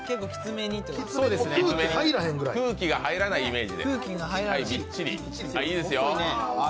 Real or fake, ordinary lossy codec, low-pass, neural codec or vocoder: real; none; none; none